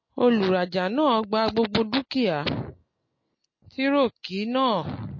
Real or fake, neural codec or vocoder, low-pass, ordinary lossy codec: real; none; 7.2 kHz; MP3, 32 kbps